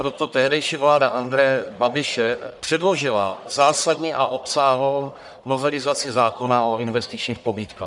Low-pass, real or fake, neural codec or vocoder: 10.8 kHz; fake; codec, 44.1 kHz, 1.7 kbps, Pupu-Codec